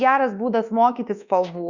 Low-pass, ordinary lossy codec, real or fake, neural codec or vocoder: 7.2 kHz; Opus, 64 kbps; fake; codec, 24 kHz, 1.2 kbps, DualCodec